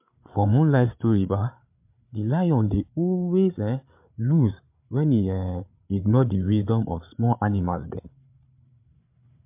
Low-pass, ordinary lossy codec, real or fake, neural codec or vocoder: 3.6 kHz; MP3, 32 kbps; fake; codec, 16 kHz, 8 kbps, FreqCodec, larger model